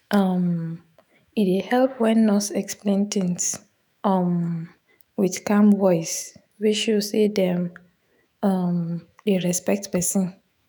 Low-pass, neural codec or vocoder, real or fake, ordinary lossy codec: none; autoencoder, 48 kHz, 128 numbers a frame, DAC-VAE, trained on Japanese speech; fake; none